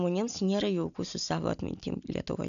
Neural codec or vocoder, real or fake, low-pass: none; real; 7.2 kHz